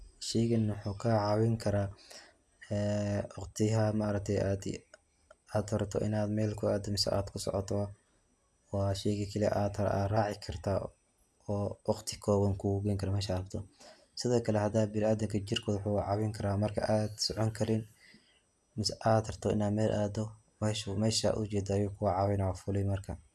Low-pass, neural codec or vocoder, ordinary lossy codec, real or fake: none; none; none; real